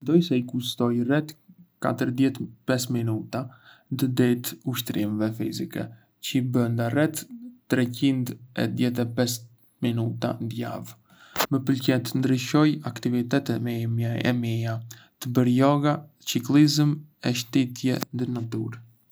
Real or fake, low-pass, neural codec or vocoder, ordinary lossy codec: real; none; none; none